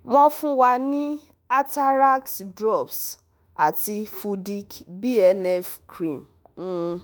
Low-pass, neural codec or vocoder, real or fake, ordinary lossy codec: none; autoencoder, 48 kHz, 32 numbers a frame, DAC-VAE, trained on Japanese speech; fake; none